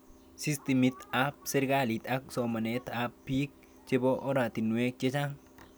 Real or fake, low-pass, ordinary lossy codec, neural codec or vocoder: real; none; none; none